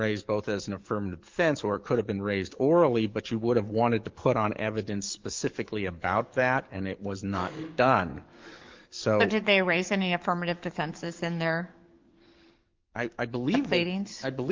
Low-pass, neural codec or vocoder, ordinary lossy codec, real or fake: 7.2 kHz; codec, 44.1 kHz, 7.8 kbps, DAC; Opus, 32 kbps; fake